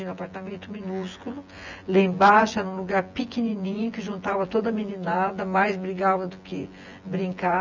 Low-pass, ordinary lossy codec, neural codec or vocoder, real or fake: 7.2 kHz; none; vocoder, 24 kHz, 100 mel bands, Vocos; fake